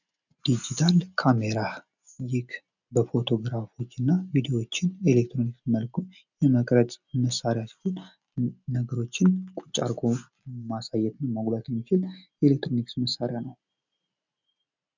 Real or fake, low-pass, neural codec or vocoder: real; 7.2 kHz; none